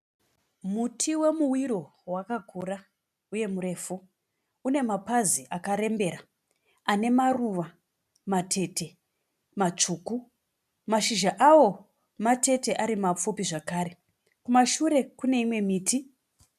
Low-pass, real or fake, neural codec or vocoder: 14.4 kHz; real; none